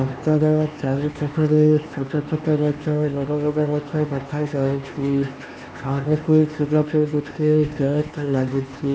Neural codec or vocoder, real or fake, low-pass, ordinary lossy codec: codec, 16 kHz, 2 kbps, X-Codec, HuBERT features, trained on LibriSpeech; fake; none; none